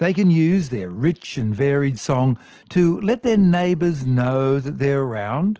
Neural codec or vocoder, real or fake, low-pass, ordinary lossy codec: none; real; 7.2 kHz; Opus, 16 kbps